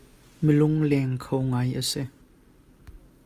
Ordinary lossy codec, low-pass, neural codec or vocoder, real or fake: Opus, 32 kbps; 14.4 kHz; none; real